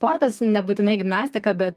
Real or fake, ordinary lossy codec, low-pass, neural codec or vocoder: fake; Opus, 32 kbps; 14.4 kHz; codec, 32 kHz, 1.9 kbps, SNAC